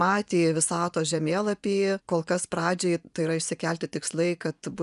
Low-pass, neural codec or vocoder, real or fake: 10.8 kHz; vocoder, 24 kHz, 100 mel bands, Vocos; fake